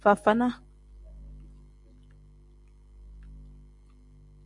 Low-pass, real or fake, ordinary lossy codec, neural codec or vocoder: 10.8 kHz; real; AAC, 64 kbps; none